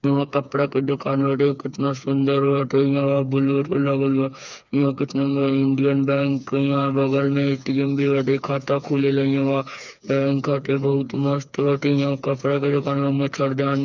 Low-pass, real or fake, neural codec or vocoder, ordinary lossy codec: 7.2 kHz; fake; codec, 16 kHz, 4 kbps, FreqCodec, smaller model; none